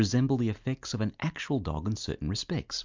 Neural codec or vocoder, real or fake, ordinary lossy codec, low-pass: none; real; MP3, 64 kbps; 7.2 kHz